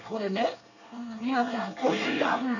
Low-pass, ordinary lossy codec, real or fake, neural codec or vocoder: 7.2 kHz; none; fake; codec, 24 kHz, 1 kbps, SNAC